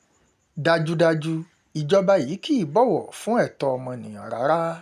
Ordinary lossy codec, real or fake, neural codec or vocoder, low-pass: none; real; none; 14.4 kHz